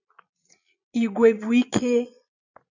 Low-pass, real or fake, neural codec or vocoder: 7.2 kHz; fake; vocoder, 22.05 kHz, 80 mel bands, Vocos